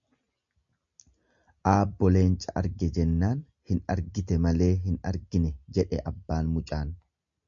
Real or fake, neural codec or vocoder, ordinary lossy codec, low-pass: real; none; MP3, 96 kbps; 7.2 kHz